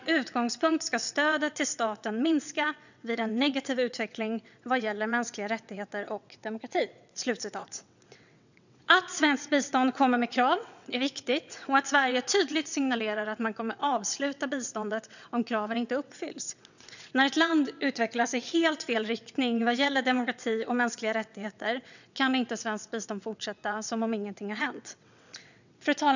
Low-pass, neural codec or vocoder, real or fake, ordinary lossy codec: 7.2 kHz; vocoder, 22.05 kHz, 80 mel bands, WaveNeXt; fake; none